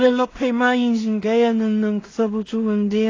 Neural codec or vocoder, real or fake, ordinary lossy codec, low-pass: codec, 16 kHz in and 24 kHz out, 0.4 kbps, LongCat-Audio-Codec, two codebook decoder; fake; AAC, 32 kbps; 7.2 kHz